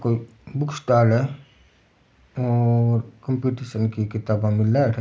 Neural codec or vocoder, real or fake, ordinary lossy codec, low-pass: none; real; none; none